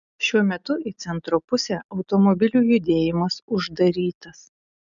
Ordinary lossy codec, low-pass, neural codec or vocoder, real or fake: MP3, 96 kbps; 7.2 kHz; none; real